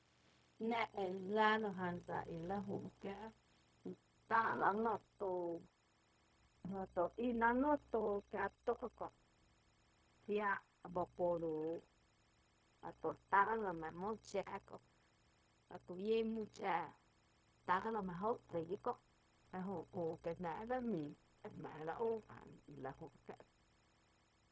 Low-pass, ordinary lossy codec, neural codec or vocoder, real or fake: none; none; codec, 16 kHz, 0.4 kbps, LongCat-Audio-Codec; fake